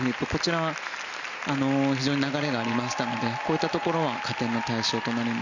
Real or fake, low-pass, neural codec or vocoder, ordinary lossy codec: real; 7.2 kHz; none; none